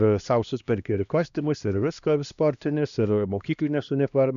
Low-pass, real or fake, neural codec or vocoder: 7.2 kHz; fake; codec, 16 kHz, 1 kbps, X-Codec, HuBERT features, trained on LibriSpeech